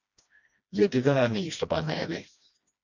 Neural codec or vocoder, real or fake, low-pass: codec, 16 kHz, 1 kbps, FreqCodec, smaller model; fake; 7.2 kHz